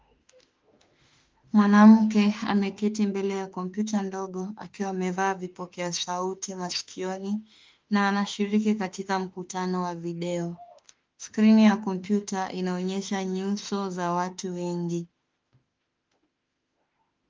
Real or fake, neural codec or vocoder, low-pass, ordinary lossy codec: fake; autoencoder, 48 kHz, 32 numbers a frame, DAC-VAE, trained on Japanese speech; 7.2 kHz; Opus, 32 kbps